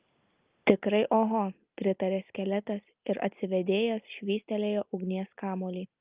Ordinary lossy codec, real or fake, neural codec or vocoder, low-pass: Opus, 24 kbps; real; none; 3.6 kHz